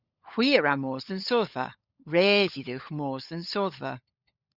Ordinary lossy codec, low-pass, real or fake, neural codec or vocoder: Opus, 64 kbps; 5.4 kHz; fake; codec, 16 kHz, 16 kbps, FunCodec, trained on LibriTTS, 50 frames a second